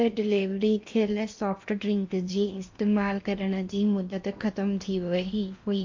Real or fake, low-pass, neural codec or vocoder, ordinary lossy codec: fake; 7.2 kHz; codec, 16 kHz in and 24 kHz out, 0.8 kbps, FocalCodec, streaming, 65536 codes; MP3, 48 kbps